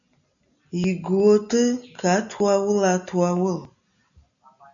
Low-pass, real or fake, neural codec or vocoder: 7.2 kHz; real; none